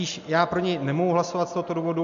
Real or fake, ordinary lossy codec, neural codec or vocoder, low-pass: real; AAC, 64 kbps; none; 7.2 kHz